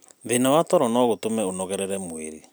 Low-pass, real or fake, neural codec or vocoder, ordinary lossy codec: none; real; none; none